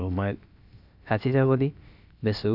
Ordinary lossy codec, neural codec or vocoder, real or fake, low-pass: AAC, 48 kbps; codec, 16 kHz, about 1 kbps, DyCAST, with the encoder's durations; fake; 5.4 kHz